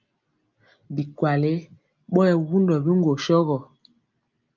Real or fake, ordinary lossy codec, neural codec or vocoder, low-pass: real; Opus, 24 kbps; none; 7.2 kHz